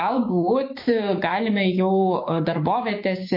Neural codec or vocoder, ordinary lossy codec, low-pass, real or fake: codec, 16 kHz, 6 kbps, DAC; MP3, 32 kbps; 5.4 kHz; fake